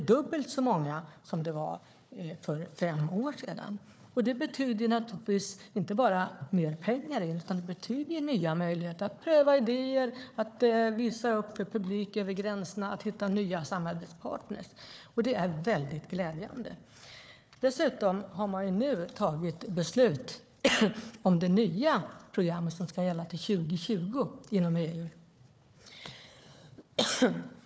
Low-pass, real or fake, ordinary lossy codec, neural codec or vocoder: none; fake; none; codec, 16 kHz, 4 kbps, FunCodec, trained on Chinese and English, 50 frames a second